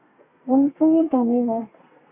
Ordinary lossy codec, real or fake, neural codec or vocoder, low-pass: Opus, 64 kbps; fake; codec, 32 kHz, 1.9 kbps, SNAC; 3.6 kHz